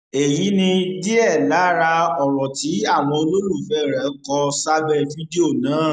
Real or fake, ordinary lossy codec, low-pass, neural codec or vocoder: real; none; 9.9 kHz; none